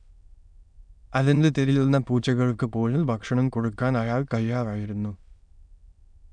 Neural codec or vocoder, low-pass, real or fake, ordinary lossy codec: autoencoder, 22.05 kHz, a latent of 192 numbers a frame, VITS, trained on many speakers; 9.9 kHz; fake; none